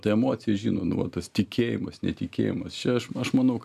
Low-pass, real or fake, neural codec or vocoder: 14.4 kHz; real; none